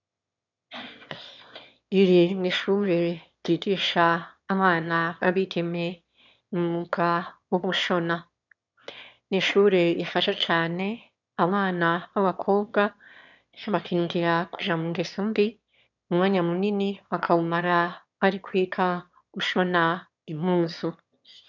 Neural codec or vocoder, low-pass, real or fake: autoencoder, 22.05 kHz, a latent of 192 numbers a frame, VITS, trained on one speaker; 7.2 kHz; fake